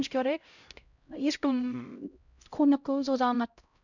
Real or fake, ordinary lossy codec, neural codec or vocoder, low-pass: fake; none; codec, 16 kHz, 0.5 kbps, X-Codec, HuBERT features, trained on LibriSpeech; 7.2 kHz